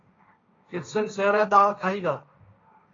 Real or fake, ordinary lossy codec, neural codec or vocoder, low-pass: fake; AAC, 32 kbps; codec, 16 kHz, 1.1 kbps, Voila-Tokenizer; 7.2 kHz